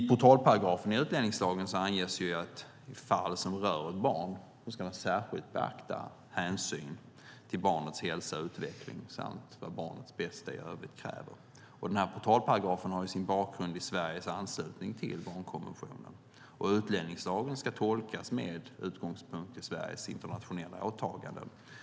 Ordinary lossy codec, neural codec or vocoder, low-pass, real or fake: none; none; none; real